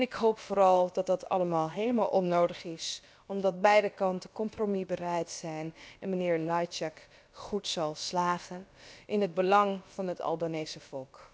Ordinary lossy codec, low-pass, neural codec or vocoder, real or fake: none; none; codec, 16 kHz, about 1 kbps, DyCAST, with the encoder's durations; fake